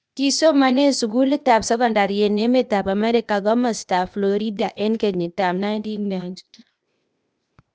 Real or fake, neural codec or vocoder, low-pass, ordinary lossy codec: fake; codec, 16 kHz, 0.8 kbps, ZipCodec; none; none